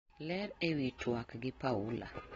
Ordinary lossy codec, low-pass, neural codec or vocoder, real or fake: AAC, 24 kbps; 9.9 kHz; none; real